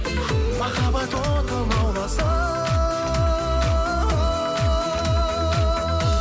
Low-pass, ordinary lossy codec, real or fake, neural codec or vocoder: none; none; real; none